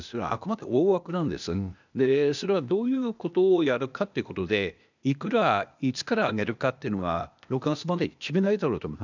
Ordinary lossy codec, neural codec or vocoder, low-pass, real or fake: none; codec, 16 kHz, 0.8 kbps, ZipCodec; 7.2 kHz; fake